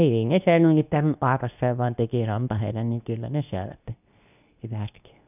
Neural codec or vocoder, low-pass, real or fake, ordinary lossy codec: codec, 24 kHz, 0.9 kbps, WavTokenizer, medium speech release version 2; 3.6 kHz; fake; none